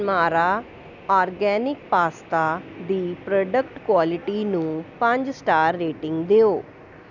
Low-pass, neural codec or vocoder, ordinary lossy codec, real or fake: 7.2 kHz; none; none; real